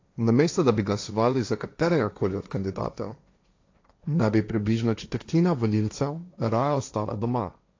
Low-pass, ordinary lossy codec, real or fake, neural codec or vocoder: 7.2 kHz; AAC, 48 kbps; fake; codec, 16 kHz, 1.1 kbps, Voila-Tokenizer